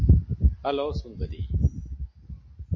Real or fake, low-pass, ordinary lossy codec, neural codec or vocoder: real; 7.2 kHz; MP3, 32 kbps; none